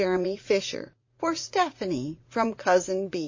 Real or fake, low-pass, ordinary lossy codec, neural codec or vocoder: fake; 7.2 kHz; MP3, 32 kbps; vocoder, 44.1 kHz, 80 mel bands, Vocos